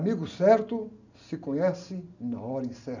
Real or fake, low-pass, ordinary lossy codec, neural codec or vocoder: real; 7.2 kHz; none; none